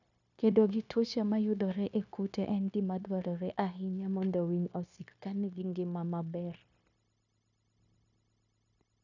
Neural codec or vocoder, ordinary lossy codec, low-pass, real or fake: codec, 16 kHz, 0.9 kbps, LongCat-Audio-Codec; none; 7.2 kHz; fake